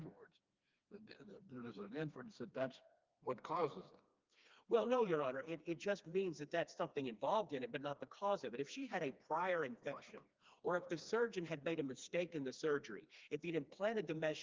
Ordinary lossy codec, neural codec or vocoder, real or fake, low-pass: Opus, 24 kbps; codec, 16 kHz, 2 kbps, FreqCodec, smaller model; fake; 7.2 kHz